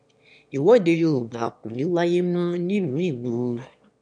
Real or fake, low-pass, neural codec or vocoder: fake; 9.9 kHz; autoencoder, 22.05 kHz, a latent of 192 numbers a frame, VITS, trained on one speaker